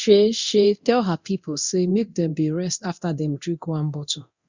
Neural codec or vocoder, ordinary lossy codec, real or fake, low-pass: codec, 24 kHz, 0.9 kbps, DualCodec; Opus, 64 kbps; fake; 7.2 kHz